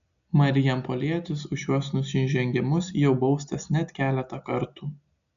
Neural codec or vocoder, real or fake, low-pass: none; real; 7.2 kHz